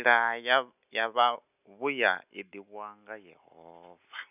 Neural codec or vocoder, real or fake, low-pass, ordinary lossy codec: none; real; 3.6 kHz; none